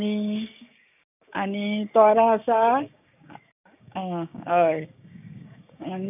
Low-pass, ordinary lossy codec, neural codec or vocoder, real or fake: 3.6 kHz; none; codec, 16 kHz, 6 kbps, DAC; fake